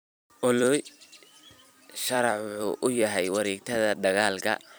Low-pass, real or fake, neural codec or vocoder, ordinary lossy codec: none; fake; vocoder, 44.1 kHz, 128 mel bands every 256 samples, BigVGAN v2; none